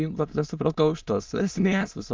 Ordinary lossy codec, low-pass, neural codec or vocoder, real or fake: Opus, 24 kbps; 7.2 kHz; autoencoder, 22.05 kHz, a latent of 192 numbers a frame, VITS, trained on many speakers; fake